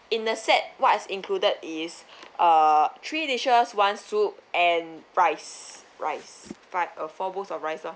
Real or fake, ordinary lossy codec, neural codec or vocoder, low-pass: real; none; none; none